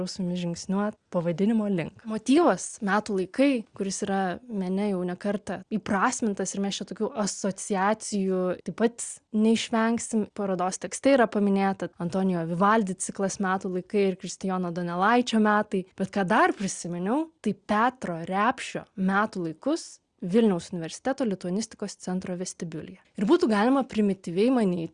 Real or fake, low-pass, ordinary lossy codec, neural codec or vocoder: real; 9.9 kHz; Opus, 64 kbps; none